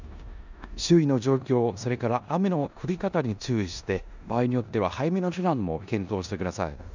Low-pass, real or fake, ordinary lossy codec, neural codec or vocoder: 7.2 kHz; fake; none; codec, 16 kHz in and 24 kHz out, 0.9 kbps, LongCat-Audio-Codec, four codebook decoder